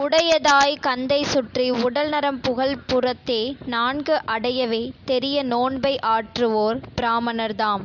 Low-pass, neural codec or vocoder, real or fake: 7.2 kHz; none; real